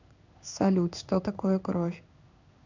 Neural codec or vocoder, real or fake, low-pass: codec, 16 kHz in and 24 kHz out, 1 kbps, XY-Tokenizer; fake; 7.2 kHz